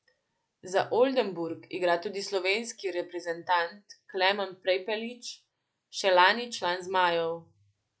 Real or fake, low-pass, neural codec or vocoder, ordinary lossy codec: real; none; none; none